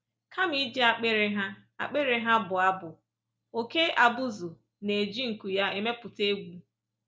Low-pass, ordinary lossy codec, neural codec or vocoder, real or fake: none; none; none; real